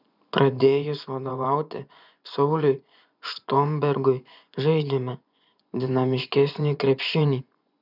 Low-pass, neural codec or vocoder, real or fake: 5.4 kHz; vocoder, 24 kHz, 100 mel bands, Vocos; fake